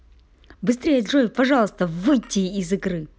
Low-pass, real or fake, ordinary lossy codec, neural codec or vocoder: none; real; none; none